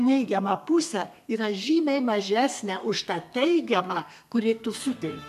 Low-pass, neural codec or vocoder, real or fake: 14.4 kHz; codec, 32 kHz, 1.9 kbps, SNAC; fake